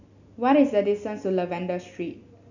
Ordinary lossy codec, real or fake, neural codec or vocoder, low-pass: none; real; none; 7.2 kHz